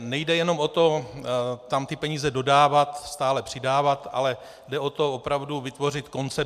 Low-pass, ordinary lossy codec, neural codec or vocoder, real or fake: 14.4 kHz; MP3, 96 kbps; none; real